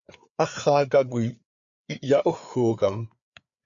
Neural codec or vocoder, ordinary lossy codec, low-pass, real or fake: codec, 16 kHz, 4 kbps, FreqCodec, larger model; MP3, 96 kbps; 7.2 kHz; fake